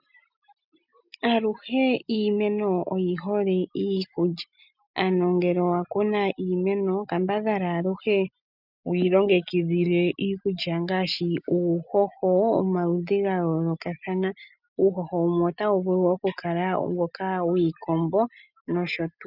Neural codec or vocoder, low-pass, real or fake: none; 5.4 kHz; real